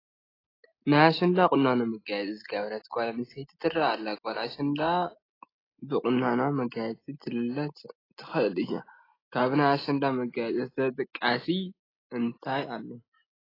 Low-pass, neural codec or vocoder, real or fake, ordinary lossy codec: 5.4 kHz; none; real; AAC, 24 kbps